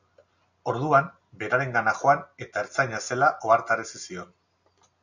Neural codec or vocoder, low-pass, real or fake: none; 7.2 kHz; real